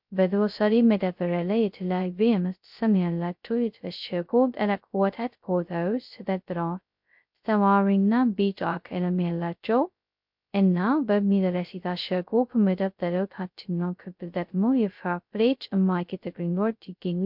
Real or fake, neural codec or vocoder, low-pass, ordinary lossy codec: fake; codec, 16 kHz, 0.2 kbps, FocalCodec; 5.4 kHz; MP3, 48 kbps